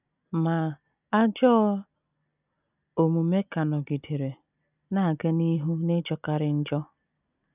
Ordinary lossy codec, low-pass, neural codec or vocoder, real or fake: none; 3.6 kHz; none; real